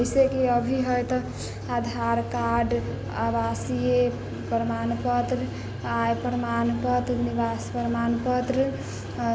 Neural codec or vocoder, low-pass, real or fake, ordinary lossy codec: none; none; real; none